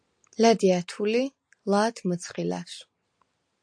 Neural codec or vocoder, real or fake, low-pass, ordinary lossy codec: none; real; 9.9 kHz; AAC, 64 kbps